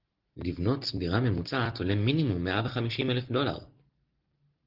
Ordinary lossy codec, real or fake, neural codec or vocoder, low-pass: Opus, 16 kbps; real; none; 5.4 kHz